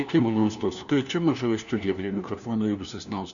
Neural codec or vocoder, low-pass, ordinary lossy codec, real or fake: codec, 16 kHz, 1 kbps, FunCodec, trained on LibriTTS, 50 frames a second; 7.2 kHz; MP3, 96 kbps; fake